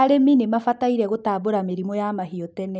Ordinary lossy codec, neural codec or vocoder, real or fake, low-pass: none; none; real; none